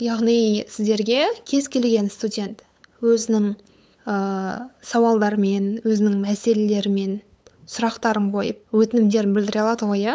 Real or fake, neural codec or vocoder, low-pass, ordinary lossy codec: fake; codec, 16 kHz, 8 kbps, FunCodec, trained on LibriTTS, 25 frames a second; none; none